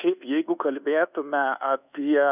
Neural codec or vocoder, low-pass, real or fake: codec, 24 kHz, 1.2 kbps, DualCodec; 3.6 kHz; fake